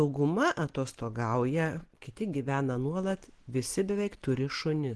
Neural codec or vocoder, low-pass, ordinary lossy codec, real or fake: none; 10.8 kHz; Opus, 16 kbps; real